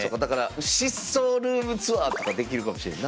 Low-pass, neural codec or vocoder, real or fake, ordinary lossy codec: none; none; real; none